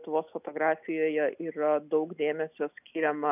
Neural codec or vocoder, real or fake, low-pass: none; real; 3.6 kHz